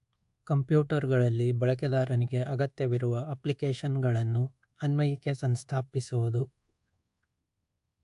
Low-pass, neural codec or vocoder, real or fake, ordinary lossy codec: 10.8 kHz; codec, 24 kHz, 1.2 kbps, DualCodec; fake; AAC, 64 kbps